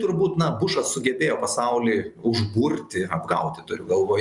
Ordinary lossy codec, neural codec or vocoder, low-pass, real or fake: Opus, 64 kbps; none; 10.8 kHz; real